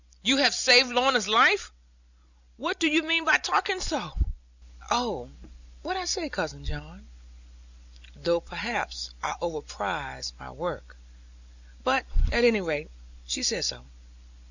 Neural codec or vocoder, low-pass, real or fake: none; 7.2 kHz; real